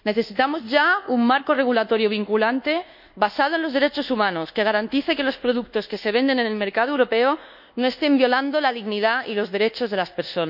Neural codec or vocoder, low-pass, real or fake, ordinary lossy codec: codec, 24 kHz, 1.2 kbps, DualCodec; 5.4 kHz; fake; none